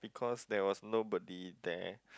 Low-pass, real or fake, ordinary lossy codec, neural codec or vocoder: none; real; none; none